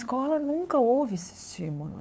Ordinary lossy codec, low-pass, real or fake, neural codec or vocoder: none; none; fake; codec, 16 kHz, 8 kbps, FunCodec, trained on LibriTTS, 25 frames a second